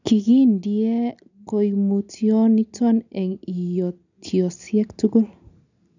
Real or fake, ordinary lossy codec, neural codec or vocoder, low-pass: real; none; none; 7.2 kHz